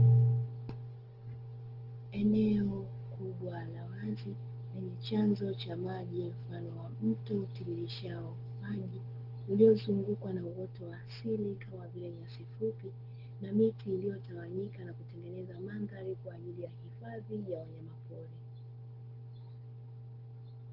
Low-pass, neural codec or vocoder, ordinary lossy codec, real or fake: 5.4 kHz; none; Opus, 16 kbps; real